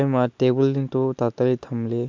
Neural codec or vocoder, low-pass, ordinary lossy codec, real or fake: none; 7.2 kHz; MP3, 64 kbps; real